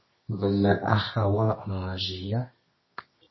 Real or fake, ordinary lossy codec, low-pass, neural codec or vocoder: fake; MP3, 24 kbps; 7.2 kHz; codec, 24 kHz, 0.9 kbps, WavTokenizer, medium music audio release